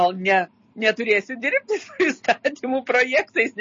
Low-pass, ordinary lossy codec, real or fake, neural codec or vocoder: 7.2 kHz; MP3, 32 kbps; real; none